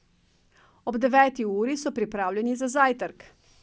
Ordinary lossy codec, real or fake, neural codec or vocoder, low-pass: none; real; none; none